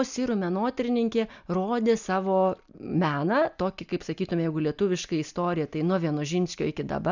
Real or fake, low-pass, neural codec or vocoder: real; 7.2 kHz; none